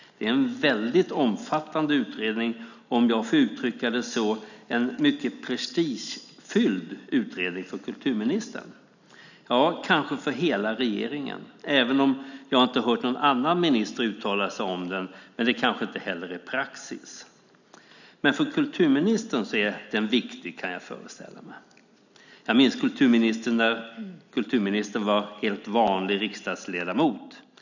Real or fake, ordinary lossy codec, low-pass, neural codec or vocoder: real; none; 7.2 kHz; none